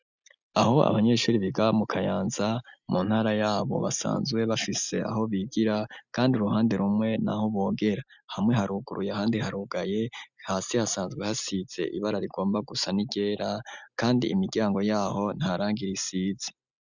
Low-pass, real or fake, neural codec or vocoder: 7.2 kHz; real; none